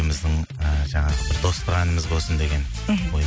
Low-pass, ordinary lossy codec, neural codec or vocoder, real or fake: none; none; none; real